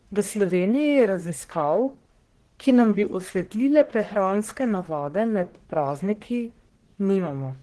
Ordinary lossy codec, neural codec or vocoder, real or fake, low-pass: Opus, 16 kbps; codec, 44.1 kHz, 1.7 kbps, Pupu-Codec; fake; 10.8 kHz